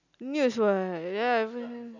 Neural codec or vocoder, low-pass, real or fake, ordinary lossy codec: none; 7.2 kHz; real; none